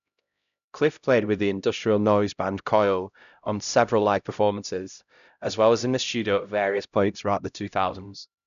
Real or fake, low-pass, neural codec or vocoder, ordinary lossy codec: fake; 7.2 kHz; codec, 16 kHz, 0.5 kbps, X-Codec, HuBERT features, trained on LibriSpeech; none